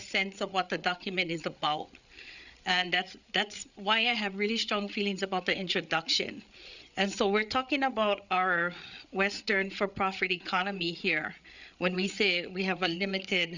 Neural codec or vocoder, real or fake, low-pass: codec, 16 kHz, 8 kbps, FreqCodec, larger model; fake; 7.2 kHz